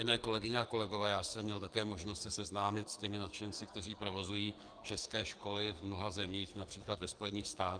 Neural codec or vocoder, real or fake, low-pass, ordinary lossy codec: codec, 44.1 kHz, 2.6 kbps, SNAC; fake; 9.9 kHz; Opus, 32 kbps